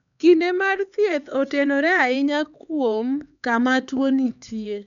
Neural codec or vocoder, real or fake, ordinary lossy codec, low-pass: codec, 16 kHz, 4 kbps, X-Codec, HuBERT features, trained on LibriSpeech; fake; none; 7.2 kHz